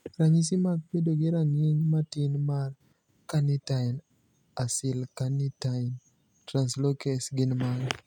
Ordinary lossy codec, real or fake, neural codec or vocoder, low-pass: none; real; none; 19.8 kHz